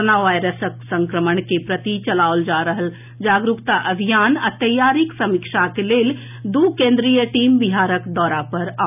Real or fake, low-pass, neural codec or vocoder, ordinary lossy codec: real; 3.6 kHz; none; none